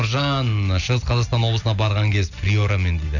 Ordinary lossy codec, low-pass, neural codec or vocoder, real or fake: none; 7.2 kHz; none; real